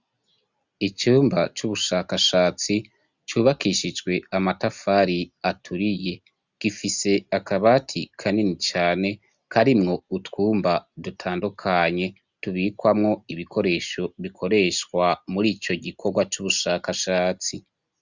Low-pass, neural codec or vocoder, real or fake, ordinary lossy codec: 7.2 kHz; none; real; Opus, 64 kbps